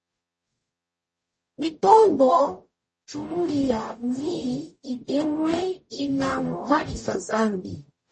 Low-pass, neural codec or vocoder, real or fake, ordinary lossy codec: 10.8 kHz; codec, 44.1 kHz, 0.9 kbps, DAC; fake; MP3, 32 kbps